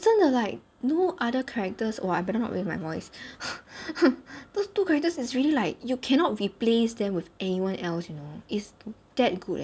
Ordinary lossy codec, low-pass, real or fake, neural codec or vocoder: none; none; real; none